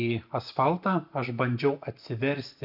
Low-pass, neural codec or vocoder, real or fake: 5.4 kHz; none; real